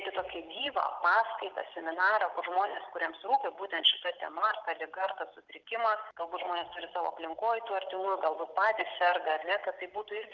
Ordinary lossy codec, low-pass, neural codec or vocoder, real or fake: Opus, 24 kbps; 7.2 kHz; none; real